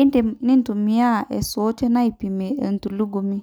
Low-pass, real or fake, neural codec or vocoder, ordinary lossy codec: none; real; none; none